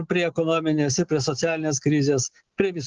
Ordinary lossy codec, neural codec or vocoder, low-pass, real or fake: Opus, 16 kbps; none; 7.2 kHz; real